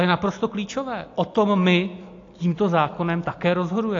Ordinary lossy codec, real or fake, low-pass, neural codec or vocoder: AAC, 48 kbps; real; 7.2 kHz; none